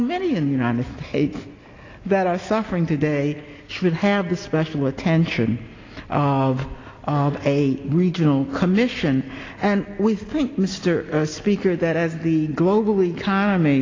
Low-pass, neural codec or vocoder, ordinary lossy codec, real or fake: 7.2 kHz; none; AAC, 32 kbps; real